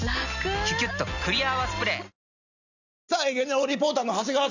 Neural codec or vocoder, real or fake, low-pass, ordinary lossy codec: none; real; 7.2 kHz; none